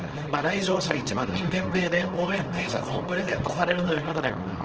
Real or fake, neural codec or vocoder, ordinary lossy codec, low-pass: fake; codec, 24 kHz, 0.9 kbps, WavTokenizer, small release; Opus, 16 kbps; 7.2 kHz